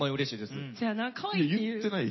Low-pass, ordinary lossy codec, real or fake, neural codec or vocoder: 7.2 kHz; MP3, 24 kbps; real; none